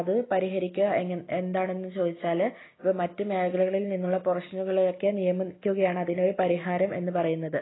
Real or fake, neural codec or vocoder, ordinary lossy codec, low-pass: real; none; AAC, 16 kbps; 7.2 kHz